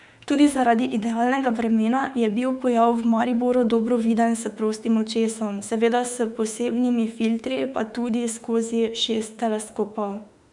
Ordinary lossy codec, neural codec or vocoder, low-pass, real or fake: none; autoencoder, 48 kHz, 32 numbers a frame, DAC-VAE, trained on Japanese speech; 10.8 kHz; fake